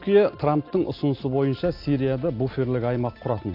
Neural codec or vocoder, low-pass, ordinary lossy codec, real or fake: none; 5.4 kHz; AAC, 32 kbps; real